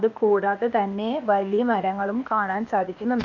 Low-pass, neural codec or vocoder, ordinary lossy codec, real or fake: 7.2 kHz; codec, 16 kHz, 0.8 kbps, ZipCodec; none; fake